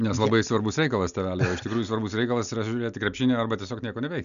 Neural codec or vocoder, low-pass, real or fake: none; 7.2 kHz; real